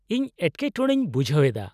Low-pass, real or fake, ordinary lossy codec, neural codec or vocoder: 14.4 kHz; real; none; none